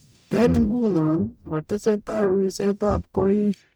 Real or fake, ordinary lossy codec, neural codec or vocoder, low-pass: fake; none; codec, 44.1 kHz, 0.9 kbps, DAC; none